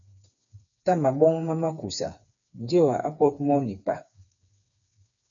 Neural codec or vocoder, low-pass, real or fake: codec, 16 kHz, 4 kbps, FreqCodec, smaller model; 7.2 kHz; fake